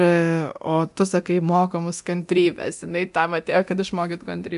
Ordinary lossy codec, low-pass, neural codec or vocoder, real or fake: AAC, 96 kbps; 10.8 kHz; codec, 24 kHz, 0.9 kbps, DualCodec; fake